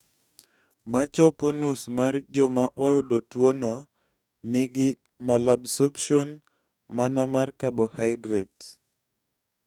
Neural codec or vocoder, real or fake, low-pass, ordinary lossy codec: codec, 44.1 kHz, 2.6 kbps, DAC; fake; 19.8 kHz; none